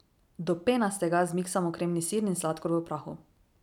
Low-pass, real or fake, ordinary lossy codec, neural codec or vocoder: 19.8 kHz; real; none; none